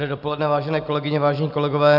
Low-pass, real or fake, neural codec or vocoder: 5.4 kHz; real; none